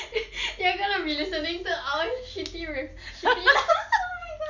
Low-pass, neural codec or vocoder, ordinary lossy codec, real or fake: 7.2 kHz; none; none; real